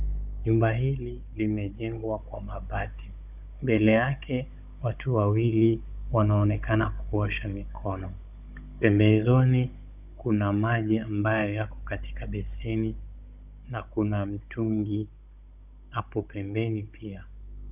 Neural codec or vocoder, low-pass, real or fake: codec, 16 kHz, 16 kbps, FunCodec, trained on Chinese and English, 50 frames a second; 3.6 kHz; fake